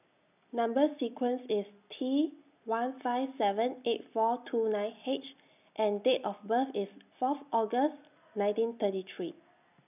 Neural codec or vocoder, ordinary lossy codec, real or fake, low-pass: none; none; real; 3.6 kHz